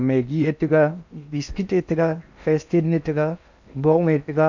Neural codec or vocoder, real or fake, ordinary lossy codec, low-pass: codec, 16 kHz in and 24 kHz out, 0.8 kbps, FocalCodec, streaming, 65536 codes; fake; none; 7.2 kHz